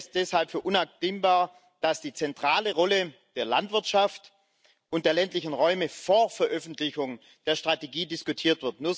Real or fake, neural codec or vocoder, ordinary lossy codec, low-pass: real; none; none; none